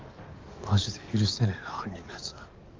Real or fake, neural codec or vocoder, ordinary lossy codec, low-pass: fake; codec, 44.1 kHz, 7.8 kbps, DAC; Opus, 24 kbps; 7.2 kHz